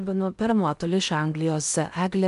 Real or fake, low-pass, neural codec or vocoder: fake; 10.8 kHz; codec, 16 kHz in and 24 kHz out, 0.8 kbps, FocalCodec, streaming, 65536 codes